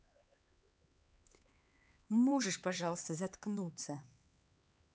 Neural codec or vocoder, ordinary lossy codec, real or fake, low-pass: codec, 16 kHz, 4 kbps, X-Codec, HuBERT features, trained on LibriSpeech; none; fake; none